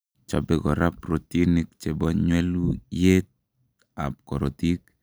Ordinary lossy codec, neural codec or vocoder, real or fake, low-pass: none; none; real; none